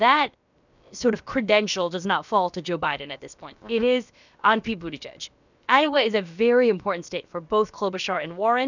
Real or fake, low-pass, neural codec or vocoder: fake; 7.2 kHz; codec, 16 kHz, about 1 kbps, DyCAST, with the encoder's durations